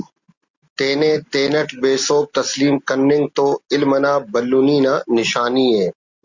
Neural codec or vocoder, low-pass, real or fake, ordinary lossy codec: none; 7.2 kHz; real; Opus, 64 kbps